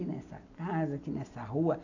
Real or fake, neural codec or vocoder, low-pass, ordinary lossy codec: real; none; 7.2 kHz; none